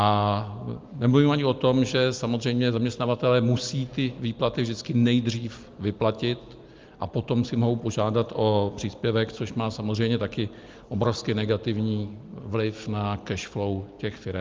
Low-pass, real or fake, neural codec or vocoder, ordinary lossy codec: 7.2 kHz; real; none; Opus, 24 kbps